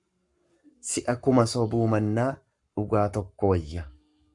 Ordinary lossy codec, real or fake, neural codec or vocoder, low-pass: Opus, 64 kbps; fake; codec, 44.1 kHz, 7.8 kbps, Pupu-Codec; 10.8 kHz